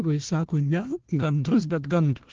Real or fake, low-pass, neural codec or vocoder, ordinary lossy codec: fake; 7.2 kHz; codec, 16 kHz, 1 kbps, FunCodec, trained on Chinese and English, 50 frames a second; Opus, 16 kbps